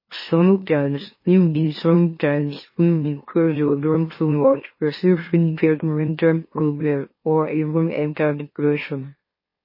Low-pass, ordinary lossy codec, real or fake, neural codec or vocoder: 5.4 kHz; MP3, 24 kbps; fake; autoencoder, 44.1 kHz, a latent of 192 numbers a frame, MeloTTS